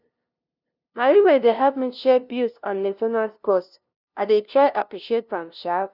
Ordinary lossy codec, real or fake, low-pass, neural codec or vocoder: none; fake; 5.4 kHz; codec, 16 kHz, 0.5 kbps, FunCodec, trained on LibriTTS, 25 frames a second